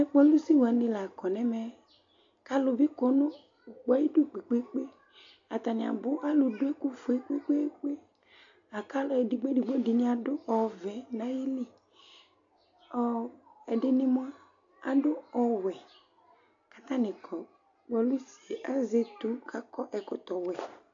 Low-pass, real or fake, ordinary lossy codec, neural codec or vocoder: 7.2 kHz; real; AAC, 64 kbps; none